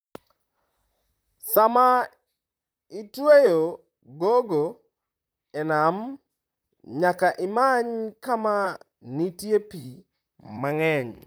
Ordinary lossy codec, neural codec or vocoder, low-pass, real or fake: none; vocoder, 44.1 kHz, 128 mel bands, Pupu-Vocoder; none; fake